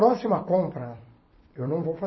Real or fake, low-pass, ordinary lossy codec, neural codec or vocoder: fake; 7.2 kHz; MP3, 24 kbps; vocoder, 44.1 kHz, 128 mel bands every 256 samples, BigVGAN v2